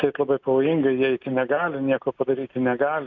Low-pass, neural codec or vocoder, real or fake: 7.2 kHz; none; real